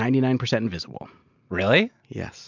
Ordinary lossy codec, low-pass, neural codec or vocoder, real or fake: MP3, 64 kbps; 7.2 kHz; none; real